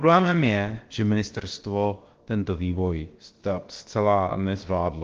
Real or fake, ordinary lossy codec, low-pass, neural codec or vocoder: fake; Opus, 16 kbps; 7.2 kHz; codec, 16 kHz, about 1 kbps, DyCAST, with the encoder's durations